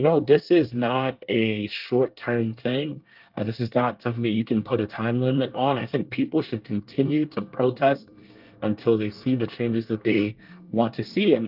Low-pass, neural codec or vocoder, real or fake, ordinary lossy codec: 5.4 kHz; codec, 24 kHz, 1 kbps, SNAC; fake; Opus, 24 kbps